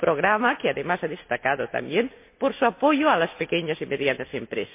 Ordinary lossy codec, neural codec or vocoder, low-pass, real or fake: MP3, 24 kbps; none; 3.6 kHz; real